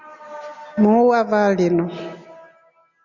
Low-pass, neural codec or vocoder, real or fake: 7.2 kHz; none; real